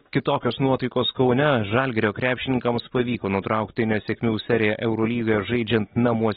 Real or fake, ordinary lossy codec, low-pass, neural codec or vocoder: real; AAC, 16 kbps; 19.8 kHz; none